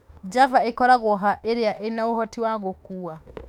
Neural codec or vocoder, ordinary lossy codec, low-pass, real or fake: autoencoder, 48 kHz, 32 numbers a frame, DAC-VAE, trained on Japanese speech; none; 19.8 kHz; fake